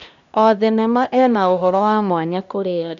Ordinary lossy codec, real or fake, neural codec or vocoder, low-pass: none; fake; codec, 16 kHz, 1 kbps, X-Codec, HuBERT features, trained on LibriSpeech; 7.2 kHz